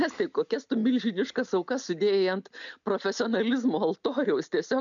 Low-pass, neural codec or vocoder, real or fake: 7.2 kHz; none; real